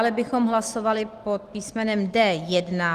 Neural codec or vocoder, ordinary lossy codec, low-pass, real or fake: none; Opus, 24 kbps; 14.4 kHz; real